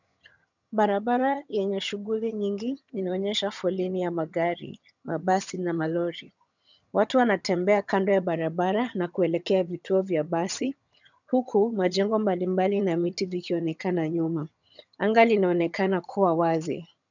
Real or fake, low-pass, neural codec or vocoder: fake; 7.2 kHz; vocoder, 22.05 kHz, 80 mel bands, HiFi-GAN